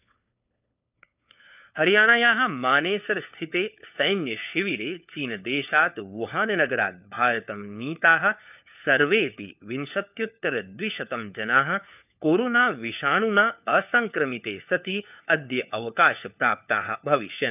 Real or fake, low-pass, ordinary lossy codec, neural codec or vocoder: fake; 3.6 kHz; none; codec, 16 kHz, 4 kbps, FunCodec, trained on LibriTTS, 50 frames a second